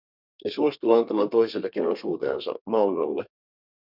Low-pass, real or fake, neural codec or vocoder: 5.4 kHz; fake; codec, 32 kHz, 1.9 kbps, SNAC